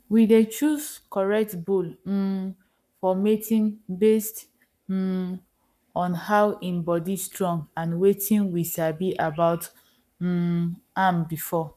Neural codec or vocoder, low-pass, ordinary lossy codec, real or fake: codec, 44.1 kHz, 7.8 kbps, Pupu-Codec; 14.4 kHz; none; fake